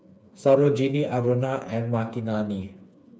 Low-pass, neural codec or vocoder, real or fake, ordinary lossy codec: none; codec, 16 kHz, 4 kbps, FreqCodec, smaller model; fake; none